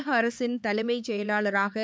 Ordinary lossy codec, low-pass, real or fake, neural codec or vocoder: none; none; fake; codec, 16 kHz, 4 kbps, X-Codec, HuBERT features, trained on LibriSpeech